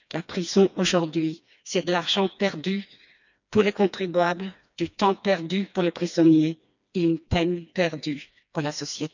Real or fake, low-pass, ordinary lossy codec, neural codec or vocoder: fake; 7.2 kHz; none; codec, 16 kHz, 2 kbps, FreqCodec, smaller model